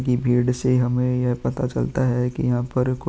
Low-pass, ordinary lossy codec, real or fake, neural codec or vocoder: none; none; real; none